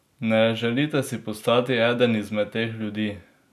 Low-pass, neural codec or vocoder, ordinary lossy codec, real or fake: 14.4 kHz; none; none; real